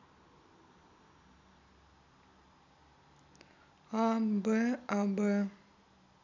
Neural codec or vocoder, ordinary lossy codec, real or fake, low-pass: none; MP3, 64 kbps; real; 7.2 kHz